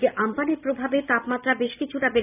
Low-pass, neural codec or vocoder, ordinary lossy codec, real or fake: 3.6 kHz; none; none; real